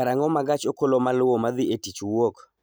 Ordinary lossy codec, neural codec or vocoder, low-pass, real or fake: none; none; none; real